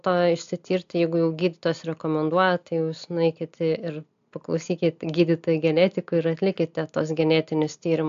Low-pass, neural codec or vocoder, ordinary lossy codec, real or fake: 7.2 kHz; none; AAC, 64 kbps; real